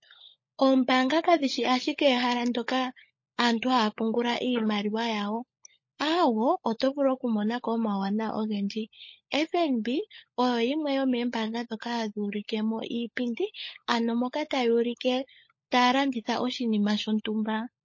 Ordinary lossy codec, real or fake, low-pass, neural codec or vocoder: MP3, 32 kbps; fake; 7.2 kHz; codec, 16 kHz, 16 kbps, FunCodec, trained on LibriTTS, 50 frames a second